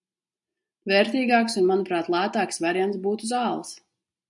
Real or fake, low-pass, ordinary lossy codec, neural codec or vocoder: real; 10.8 kHz; MP3, 96 kbps; none